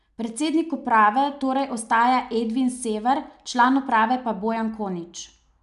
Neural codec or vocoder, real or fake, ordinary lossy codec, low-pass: none; real; none; 10.8 kHz